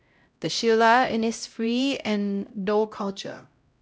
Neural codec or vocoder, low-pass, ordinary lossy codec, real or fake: codec, 16 kHz, 0.5 kbps, X-Codec, HuBERT features, trained on LibriSpeech; none; none; fake